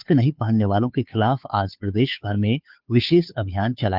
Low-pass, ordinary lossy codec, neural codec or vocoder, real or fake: 5.4 kHz; Opus, 24 kbps; codec, 16 kHz, 2 kbps, FunCodec, trained on Chinese and English, 25 frames a second; fake